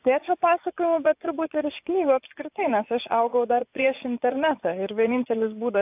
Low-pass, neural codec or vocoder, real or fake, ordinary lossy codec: 3.6 kHz; vocoder, 24 kHz, 100 mel bands, Vocos; fake; AAC, 32 kbps